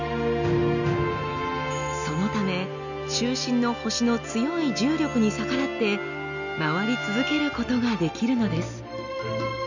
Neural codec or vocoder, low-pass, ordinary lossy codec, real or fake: none; 7.2 kHz; none; real